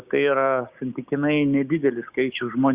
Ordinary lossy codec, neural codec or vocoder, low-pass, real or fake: Opus, 64 kbps; codec, 16 kHz, 6 kbps, DAC; 3.6 kHz; fake